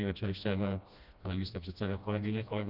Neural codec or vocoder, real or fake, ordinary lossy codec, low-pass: codec, 16 kHz, 1 kbps, FreqCodec, smaller model; fake; Opus, 64 kbps; 5.4 kHz